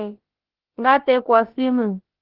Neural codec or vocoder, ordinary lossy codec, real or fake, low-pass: codec, 16 kHz, about 1 kbps, DyCAST, with the encoder's durations; Opus, 16 kbps; fake; 5.4 kHz